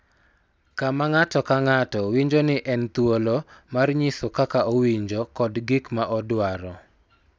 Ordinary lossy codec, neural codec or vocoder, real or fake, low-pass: none; none; real; none